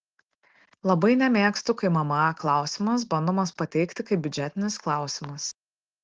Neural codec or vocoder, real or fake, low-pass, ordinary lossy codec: none; real; 7.2 kHz; Opus, 16 kbps